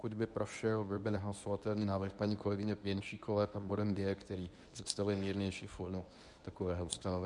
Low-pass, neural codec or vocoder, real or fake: 10.8 kHz; codec, 24 kHz, 0.9 kbps, WavTokenizer, medium speech release version 1; fake